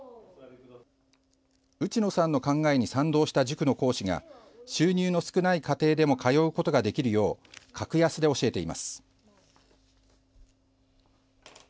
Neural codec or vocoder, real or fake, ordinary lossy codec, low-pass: none; real; none; none